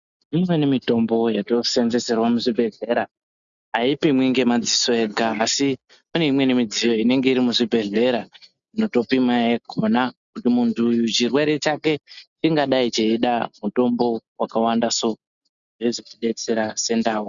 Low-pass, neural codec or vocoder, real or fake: 7.2 kHz; none; real